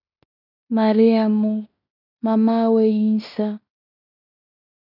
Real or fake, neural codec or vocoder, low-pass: fake; codec, 16 kHz in and 24 kHz out, 0.9 kbps, LongCat-Audio-Codec, fine tuned four codebook decoder; 5.4 kHz